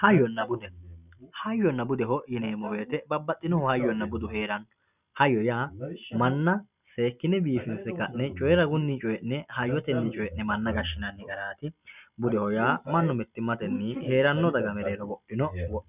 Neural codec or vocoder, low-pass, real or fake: none; 3.6 kHz; real